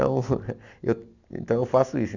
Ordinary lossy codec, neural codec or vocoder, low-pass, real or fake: none; none; 7.2 kHz; real